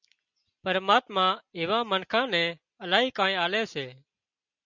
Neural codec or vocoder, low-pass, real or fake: none; 7.2 kHz; real